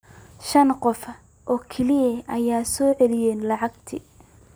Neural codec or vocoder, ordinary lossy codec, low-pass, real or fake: none; none; none; real